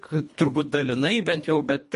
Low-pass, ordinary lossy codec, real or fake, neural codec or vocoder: 10.8 kHz; MP3, 48 kbps; fake; codec, 24 kHz, 1.5 kbps, HILCodec